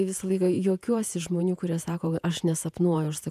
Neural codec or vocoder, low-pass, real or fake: vocoder, 48 kHz, 128 mel bands, Vocos; 14.4 kHz; fake